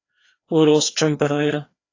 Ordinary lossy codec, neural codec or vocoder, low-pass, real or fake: AAC, 32 kbps; codec, 16 kHz, 2 kbps, FreqCodec, larger model; 7.2 kHz; fake